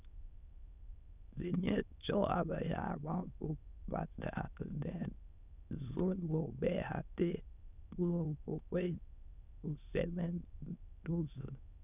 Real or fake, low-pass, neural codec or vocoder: fake; 3.6 kHz; autoencoder, 22.05 kHz, a latent of 192 numbers a frame, VITS, trained on many speakers